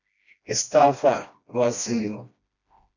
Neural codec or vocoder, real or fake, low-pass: codec, 16 kHz, 1 kbps, FreqCodec, smaller model; fake; 7.2 kHz